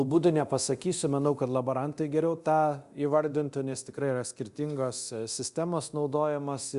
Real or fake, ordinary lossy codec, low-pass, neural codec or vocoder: fake; Opus, 64 kbps; 10.8 kHz; codec, 24 kHz, 0.9 kbps, DualCodec